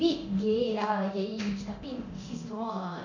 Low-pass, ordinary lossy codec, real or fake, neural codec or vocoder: 7.2 kHz; none; fake; codec, 24 kHz, 0.9 kbps, DualCodec